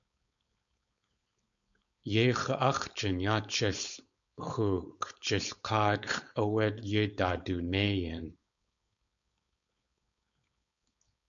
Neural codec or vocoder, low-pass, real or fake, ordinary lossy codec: codec, 16 kHz, 4.8 kbps, FACodec; 7.2 kHz; fake; MP3, 96 kbps